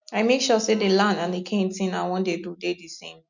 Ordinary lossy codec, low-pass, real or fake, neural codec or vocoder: none; 7.2 kHz; real; none